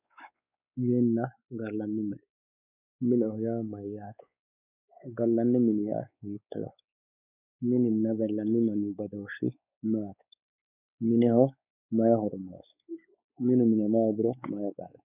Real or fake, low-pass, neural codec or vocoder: fake; 3.6 kHz; codec, 16 kHz, 4 kbps, X-Codec, WavLM features, trained on Multilingual LibriSpeech